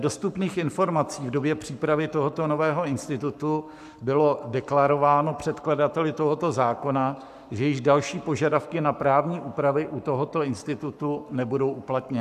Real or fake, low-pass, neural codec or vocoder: fake; 14.4 kHz; codec, 44.1 kHz, 7.8 kbps, Pupu-Codec